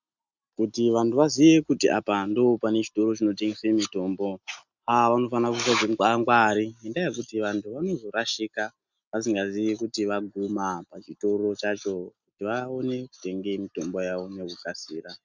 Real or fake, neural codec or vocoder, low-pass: real; none; 7.2 kHz